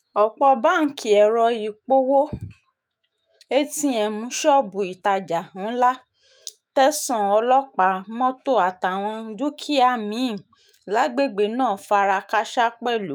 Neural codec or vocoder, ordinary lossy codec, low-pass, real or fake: autoencoder, 48 kHz, 128 numbers a frame, DAC-VAE, trained on Japanese speech; none; none; fake